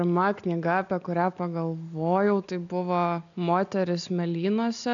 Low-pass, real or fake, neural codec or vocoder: 7.2 kHz; real; none